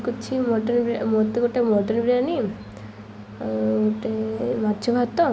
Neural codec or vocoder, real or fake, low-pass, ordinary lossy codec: none; real; none; none